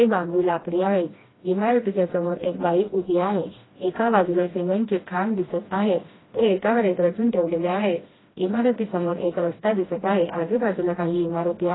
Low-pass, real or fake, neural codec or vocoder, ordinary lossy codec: 7.2 kHz; fake; codec, 16 kHz, 1 kbps, FreqCodec, smaller model; AAC, 16 kbps